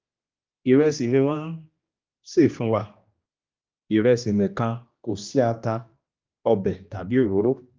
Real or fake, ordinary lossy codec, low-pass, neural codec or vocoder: fake; Opus, 24 kbps; 7.2 kHz; codec, 16 kHz, 1 kbps, X-Codec, HuBERT features, trained on general audio